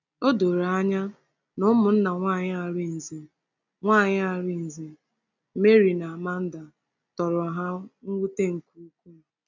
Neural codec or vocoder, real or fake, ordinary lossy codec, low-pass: none; real; none; 7.2 kHz